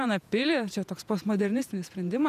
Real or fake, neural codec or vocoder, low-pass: fake; vocoder, 48 kHz, 128 mel bands, Vocos; 14.4 kHz